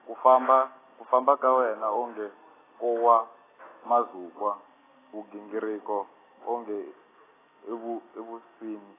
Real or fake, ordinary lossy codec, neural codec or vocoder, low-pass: real; AAC, 16 kbps; none; 3.6 kHz